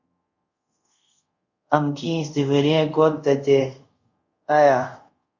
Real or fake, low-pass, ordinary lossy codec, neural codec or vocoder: fake; 7.2 kHz; Opus, 64 kbps; codec, 24 kHz, 0.5 kbps, DualCodec